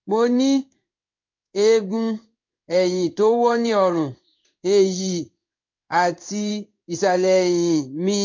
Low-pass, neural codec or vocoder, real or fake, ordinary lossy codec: 7.2 kHz; codec, 16 kHz in and 24 kHz out, 1 kbps, XY-Tokenizer; fake; MP3, 48 kbps